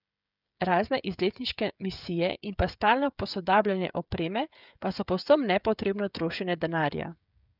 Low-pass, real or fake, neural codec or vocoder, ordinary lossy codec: 5.4 kHz; fake; codec, 16 kHz, 16 kbps, FreqCodec, smaller model; none